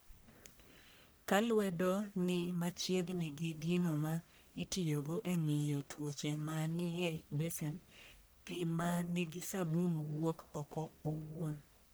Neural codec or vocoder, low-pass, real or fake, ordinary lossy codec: codec, 44.1 kHz, 1.7 kbps, Pupu-Codec; none; fake; none